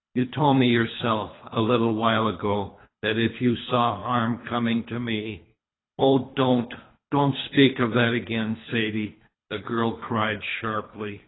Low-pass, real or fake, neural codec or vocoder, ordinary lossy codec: 7.2 kHz; fake; codec, 24 kHz, 3 kbps, HILCodec; AAC, 16 kbps